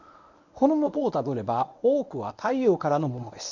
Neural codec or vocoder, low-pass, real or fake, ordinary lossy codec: codec, 24 kHz, 0.9 kbps, WavTokenizer, medium speech release version 1; 7.2 kHz; fake; none